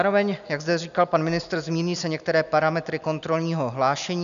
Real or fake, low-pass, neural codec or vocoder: real; 7.2 kHz; none